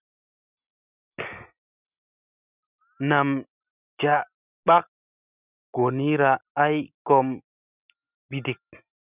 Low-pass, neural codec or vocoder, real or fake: 3.6 kHz; none; real